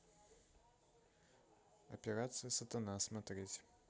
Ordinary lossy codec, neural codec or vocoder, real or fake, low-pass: none; none; real; none